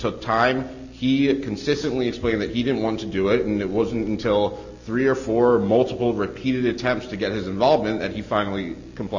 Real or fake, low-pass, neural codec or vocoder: real; 7.2 kHz; none